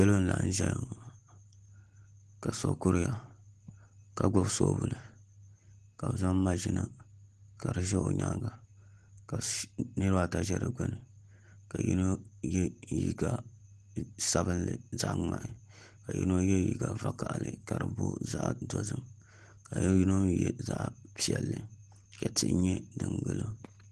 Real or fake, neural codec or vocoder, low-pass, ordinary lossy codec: real; none; 10.8 kHz; Opus, 16 kbps